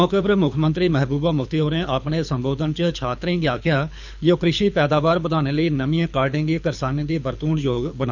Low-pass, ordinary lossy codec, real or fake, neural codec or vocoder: 7.2 kHz; none; fake; codec, 24 kHz, 6 kbps, HILCodec